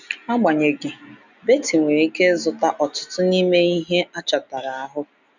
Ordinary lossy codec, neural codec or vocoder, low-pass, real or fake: none; none; 7.2 kHz; real